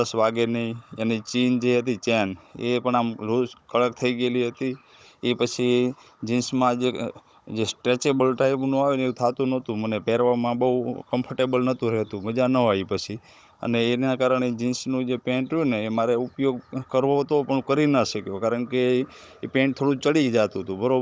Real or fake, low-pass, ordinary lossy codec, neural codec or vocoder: fake; none; none; codec, 16 kHz, 16 kbps, FunCodec, trained on Chinese and English, 50 frames a second